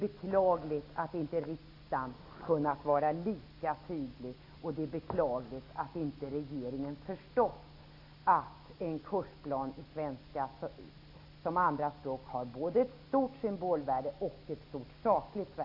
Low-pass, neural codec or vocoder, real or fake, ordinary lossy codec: 5.4 kHz; none; real; AAC, 32 kbps